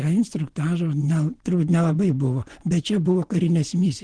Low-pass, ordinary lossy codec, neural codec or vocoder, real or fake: 9.9 kHz; Opus, 16 kbps; vocoder, 24 kHz, 100 mel bands, Vocos; fake